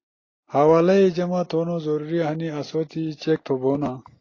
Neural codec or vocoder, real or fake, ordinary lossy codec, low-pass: none; real; AAC, 32 kbps; 7.2 kHz